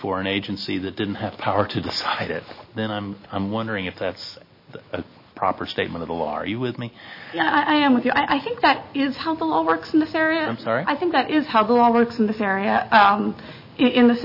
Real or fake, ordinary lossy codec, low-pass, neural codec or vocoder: real; MP3, 24 kbps; 5.4 kHz; none